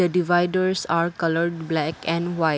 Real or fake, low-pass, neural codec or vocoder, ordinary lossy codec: real; none; none; none